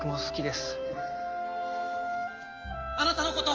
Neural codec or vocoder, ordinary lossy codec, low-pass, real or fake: none; Opus, 24 kbps; 7.2 kHz; real